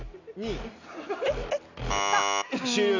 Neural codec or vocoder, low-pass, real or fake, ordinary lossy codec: none; 7.2 kHz; real; none